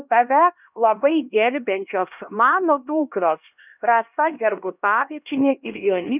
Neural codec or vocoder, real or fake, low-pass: codec, 16 kHz, 1 kbps, X-Codec, HuBERT features, trained on LibriSpeech; fake; 3.6 kHz